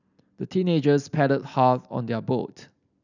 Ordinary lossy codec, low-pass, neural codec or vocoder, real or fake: none; 7.2 kHz; none; real